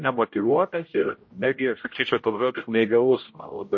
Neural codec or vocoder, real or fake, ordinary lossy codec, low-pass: codec, 16 kHz, 0.5 kbps, X-Codec, HuBERT features, trained on general audio; fake; MP3, 32 kbps; 7.2 kHz